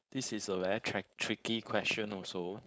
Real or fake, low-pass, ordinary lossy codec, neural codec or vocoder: fake; none; none; codec, 16 kHz, 4.8 kbps, FACodec